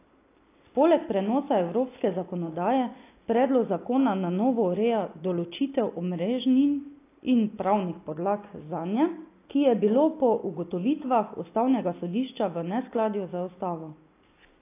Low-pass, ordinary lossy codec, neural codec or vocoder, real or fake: 3.6 kHz; AAC, 24 kbps; none; real